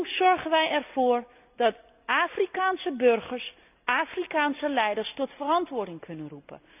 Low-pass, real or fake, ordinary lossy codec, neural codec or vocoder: 3.6 kHz; real; none; none